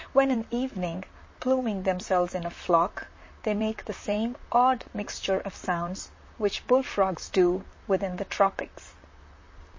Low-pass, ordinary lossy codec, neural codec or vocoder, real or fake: 7.2 kHz; MP3, 32 kbps; vocoder, 44.1 kHz, 128 mel bands, Pupu-Vocoder; fake